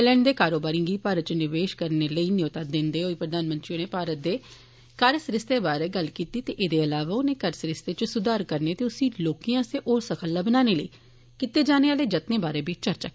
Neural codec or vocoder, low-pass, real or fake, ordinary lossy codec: none; none; real; none